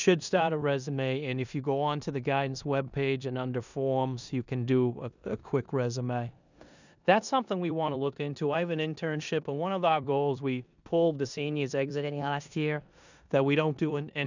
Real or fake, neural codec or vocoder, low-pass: fake; codec, 16 kHz in and 24 kHz out, 0.9 kbps, LongCat-Audio-Codec, four codebook decoder; 7.2 kHz